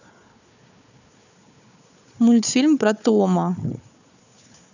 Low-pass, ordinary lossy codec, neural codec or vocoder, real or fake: 7.2 kHz; none; codec, 16 kHz, 4 kbps, FunCodec, trained on Chinese and English, 50 frames a second; fake